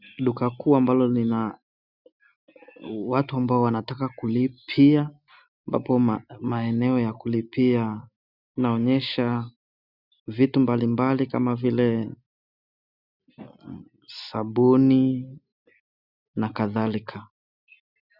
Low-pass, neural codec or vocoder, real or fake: 5.4 kHz; none; real